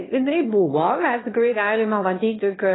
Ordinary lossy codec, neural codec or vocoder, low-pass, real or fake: AAC, 16 kbps; autoencoder, 22.05 kHz, a latent of 192 numbers a frame, VITS, trained on one speaker; 7.2 kHz; fake